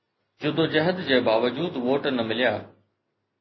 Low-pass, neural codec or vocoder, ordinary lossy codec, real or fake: 7.2 kHz; none; MP3, 24 kbps; real